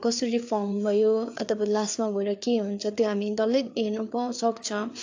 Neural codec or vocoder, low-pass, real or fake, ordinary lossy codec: codec, 16 kHz, 4 kbps, FreqCodec, larger model; 7.2 kHz; fake; AAC, 48 kbps